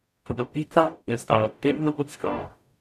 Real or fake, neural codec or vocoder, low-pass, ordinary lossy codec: fake; codec, 44.1 kHz, 0.9 kbps, DAC; 14.4 kHz; none